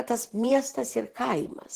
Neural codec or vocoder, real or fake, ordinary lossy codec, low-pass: vocoder, 44.1 kHz, 128 mel bands every 512 samples, BigVGAN v2; fake; Opus, 16 kbps; 14.4 kHz